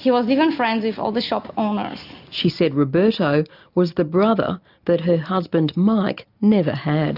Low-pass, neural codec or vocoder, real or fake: 5.4 kHz; none; real